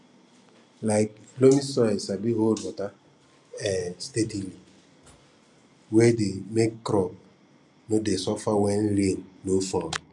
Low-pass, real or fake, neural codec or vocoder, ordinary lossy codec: 10.8 kHz; real; none; none